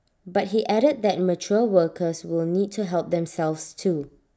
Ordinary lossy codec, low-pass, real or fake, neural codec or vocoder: none; none; real; none